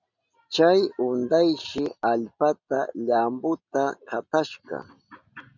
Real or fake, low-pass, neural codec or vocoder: real; 7.2 kHz; none